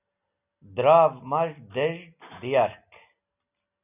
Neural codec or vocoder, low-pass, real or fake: none; 3.6 kHz; real